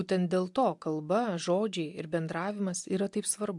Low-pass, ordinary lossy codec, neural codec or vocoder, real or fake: 10.8 kHz; MP3, 64 kbps; none; real